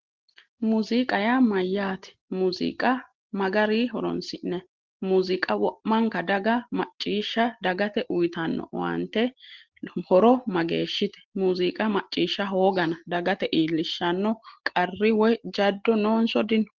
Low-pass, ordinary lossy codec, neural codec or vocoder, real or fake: 7.2 kHz; Opus, 16 kbps; none; real